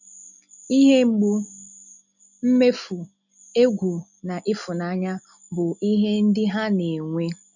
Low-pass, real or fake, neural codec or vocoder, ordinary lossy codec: 7.2 kHz; real; none; none